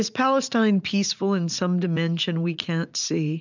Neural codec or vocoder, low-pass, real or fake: vocoder, 44.1 kHz, 80 mel bands, Vocos; 7.2 kHz; fake